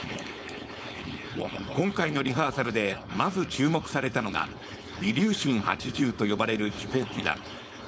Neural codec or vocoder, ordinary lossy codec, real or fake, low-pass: codec, 16 kHz, 4.8 kbps, FACodec; none; fake; none